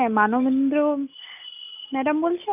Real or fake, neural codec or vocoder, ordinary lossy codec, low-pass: real; none; AAC, 32 kbps; 3.6 kHz